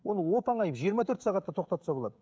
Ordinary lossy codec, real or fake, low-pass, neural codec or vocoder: none; fake; none; codec, 16 kHz, 16 kbps, FreqCodec, smaller model